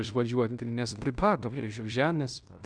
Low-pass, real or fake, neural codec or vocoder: 9.9 kHz; fake; codec, 16 kHz in and 24 kHz out, 0.9 kbps, LongCat-Audio-Codec, fine tuned four codebook decoder